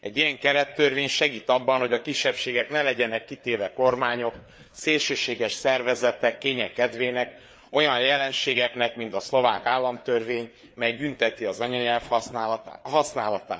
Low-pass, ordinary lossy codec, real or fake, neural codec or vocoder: none; none; fake; codec, 16 kHz, 4 kbps, FreqCodec, larger model